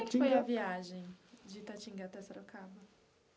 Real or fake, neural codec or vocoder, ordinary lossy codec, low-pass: real; none; none; none